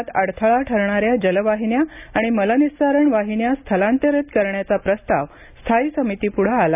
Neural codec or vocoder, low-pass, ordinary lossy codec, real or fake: none; 3.6 kHz; none; real